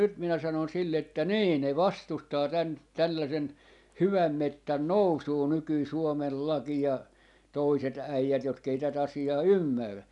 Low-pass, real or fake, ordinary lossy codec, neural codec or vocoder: 10.8 kHz; real; none; none